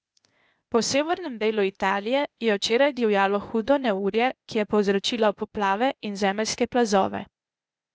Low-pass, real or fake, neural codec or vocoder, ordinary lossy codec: none; fake; codec, 16 kHz, 0.8 kbps, ZipCodec; none